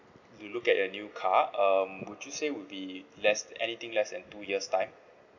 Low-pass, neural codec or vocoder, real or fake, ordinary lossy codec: 7.2 kHz; none; real; none